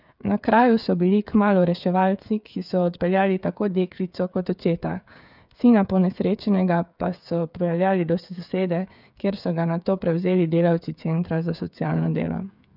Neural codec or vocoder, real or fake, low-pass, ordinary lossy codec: codec, 16 kHz, 8 kbps, FreqCodec, smaller model; fake; 5.4 kHz; none